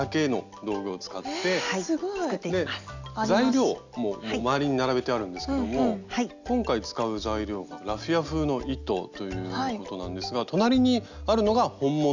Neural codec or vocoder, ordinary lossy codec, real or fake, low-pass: none; none; real; 7.2 kHz